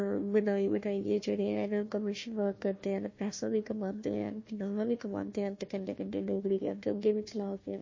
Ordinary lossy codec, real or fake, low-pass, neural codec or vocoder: MP3, 32 kbps; fake; 7.2 kHz; codec, 16 kHz, 1 kbps, FunCodec, trained on Chinese and English, 50 frames a second